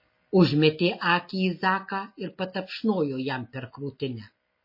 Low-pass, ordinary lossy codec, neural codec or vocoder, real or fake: 5.4 kHz; MP3, 24 kbps; none; real